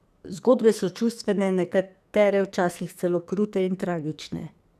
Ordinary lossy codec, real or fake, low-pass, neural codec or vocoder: none; fake; 14.4 kHz; codec, 44.1 kHz, 2.6 kbps, SNAC